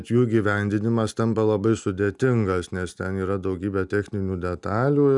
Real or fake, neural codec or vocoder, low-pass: real; none; 10.8 kHz